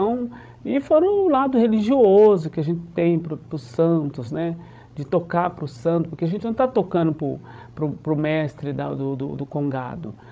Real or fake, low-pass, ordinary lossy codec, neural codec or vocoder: fake; none; none; codec, 16 kHz, 16 kbps, FreqCodec, larger model